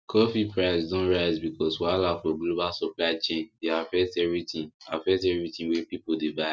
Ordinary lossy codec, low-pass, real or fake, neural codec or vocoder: none; none; real; none